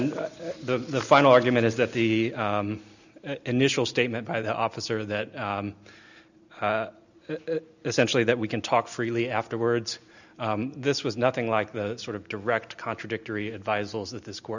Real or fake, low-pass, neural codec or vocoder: real; 7.2 kHz; none